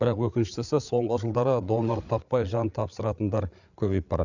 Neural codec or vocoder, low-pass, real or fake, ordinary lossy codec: codec, 16 kHz, 8 kbps, FreqCodec, larger model; 7.2 kHz; fake; none